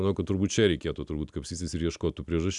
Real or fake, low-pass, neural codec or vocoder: real; 10.8 kHz; none